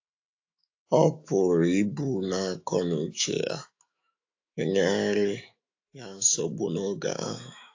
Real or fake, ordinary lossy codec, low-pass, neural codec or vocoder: fake; AAC, 48 kbps; 7.2 kHz; autoencoder, 48 kHz, 128 numbers a frame, DAC-VAE, trained on Japanese speech